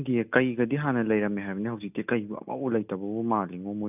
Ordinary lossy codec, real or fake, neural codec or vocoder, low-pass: none; real; none; 3.6 kHz